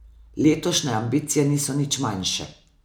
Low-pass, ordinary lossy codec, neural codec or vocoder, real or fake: none; none; none; real